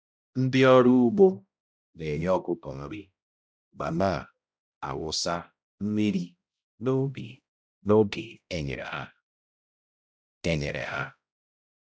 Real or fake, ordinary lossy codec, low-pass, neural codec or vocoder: fake; none; none; codec, 16 kHz, 0.5 kbps, X-Codec, HuBERT features, trained on balanced general audio